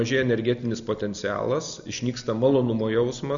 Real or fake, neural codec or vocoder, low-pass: real; none; 7.2 kHz